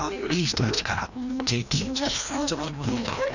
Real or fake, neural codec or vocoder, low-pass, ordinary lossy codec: fake; codec, 16 kHz, 1 kbps, FreqCodec, larger model; 7.2 kHz; none